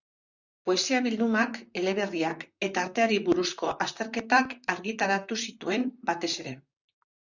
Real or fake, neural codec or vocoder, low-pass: fake; vocoder, 44.1 kHz, 128 mel bands, Pupu-Vocoder; 7.2 kHz